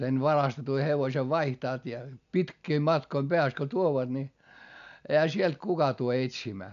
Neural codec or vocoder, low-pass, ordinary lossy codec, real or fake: none; 7.2 kHz; none; real